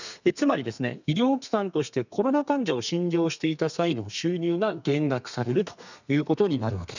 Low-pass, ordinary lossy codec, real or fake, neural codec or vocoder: 7.2 kHz; none; fake; codec, 32 kHz, 1.9 kbps, SNAC